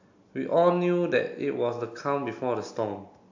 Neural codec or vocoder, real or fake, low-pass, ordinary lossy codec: none; real; 7.2 kHz; AAC, 48 kbps